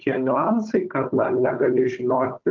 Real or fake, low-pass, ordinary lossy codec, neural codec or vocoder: fake; 7.2 kHz; Opus, 32 kbps; codec, 16 kHz, 4 kbps, FunCodec, trained on Chinese and English, 50 frames a second